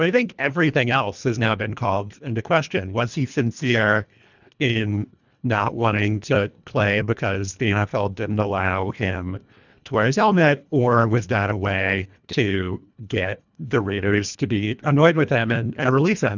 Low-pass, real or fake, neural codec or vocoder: 7.2 kHz; fake; codec, 24 kHz, 1.5 kbps, HILCodec